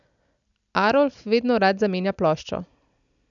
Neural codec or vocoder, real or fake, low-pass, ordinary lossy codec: none; real; 7.2 kHz; none